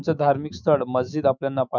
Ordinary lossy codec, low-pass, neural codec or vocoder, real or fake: none; 7.2 kHz; autoencoder, 48 kHz, 128 numbers a frame, DAC-VAE, trained on Japanese speech; fake